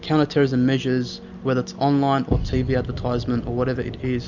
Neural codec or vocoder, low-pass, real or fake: none; 7.2 kHz; real